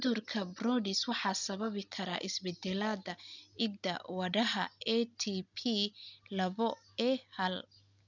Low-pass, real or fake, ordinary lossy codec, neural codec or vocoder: 7.2 kHz; real; none; none